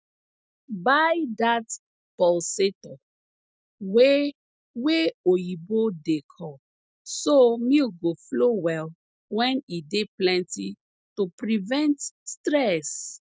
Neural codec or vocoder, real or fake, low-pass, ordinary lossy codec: none; real; none; none